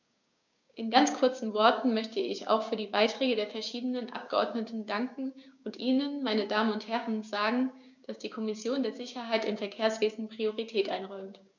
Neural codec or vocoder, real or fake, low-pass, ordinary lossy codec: codec, 16 kHz, 6 kbps, DAC; fake; 7.2 kHz; none